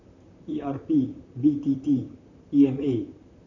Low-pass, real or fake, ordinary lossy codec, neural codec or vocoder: 7.2 kHz; fake; none; vocoder, 44.1 kHz, 128 mel bands, Pupu-Vocoder